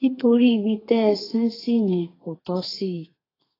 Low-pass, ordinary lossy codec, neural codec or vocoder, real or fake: 5.4 kHz; AAC, 24 kbps; codec, 32 kHz, 1.9 kbps, SNAC; fake